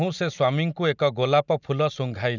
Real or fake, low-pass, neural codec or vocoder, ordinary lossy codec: real; 7.2 kHz; none; none